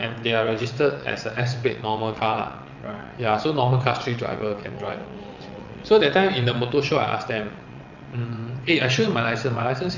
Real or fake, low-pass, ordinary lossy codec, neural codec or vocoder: fake; 7.2 kHz; none; vocoder, 22.05 kHz, 80 mel bands, WaveNeXt